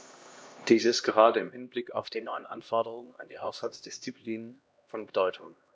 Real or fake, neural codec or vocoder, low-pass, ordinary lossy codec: fake; codec, 16 kHz, 1 kbps, X-Codec, HuBERT features, trained on LibriSpeech; none; none